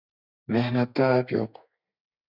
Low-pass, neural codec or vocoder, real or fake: 5.4 kHz; codec, 32 kHz, 1.9 kbps, SNAC; fake